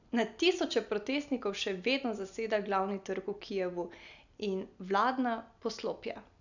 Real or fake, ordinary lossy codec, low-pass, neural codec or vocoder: real; none; 7.2 kHz; none